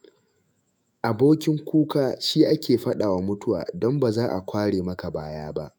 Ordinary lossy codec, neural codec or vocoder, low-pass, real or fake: none; autoencoder, 48 kHz, 128 numbers a frame, DAC-VAE, trained on Japanese speech; none; fake